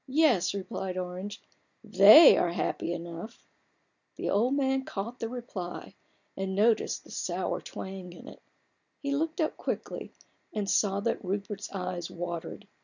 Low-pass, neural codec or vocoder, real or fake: 7.2 kHz; none; real